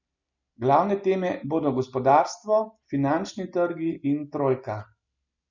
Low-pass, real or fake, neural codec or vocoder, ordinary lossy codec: 7.2 kHz; real; none; none